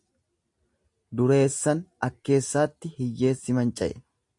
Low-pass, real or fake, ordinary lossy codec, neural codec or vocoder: 10.8 kHz; real; AAC, 64 kbps; none